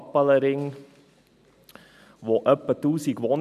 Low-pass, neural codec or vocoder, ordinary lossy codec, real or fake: 14.4 kHz; none; none; real